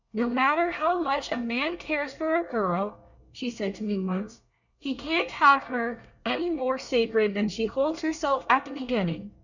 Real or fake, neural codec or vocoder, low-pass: fake; codec, 24 kHz, 1 kbps, SNAC; 7.2 kHz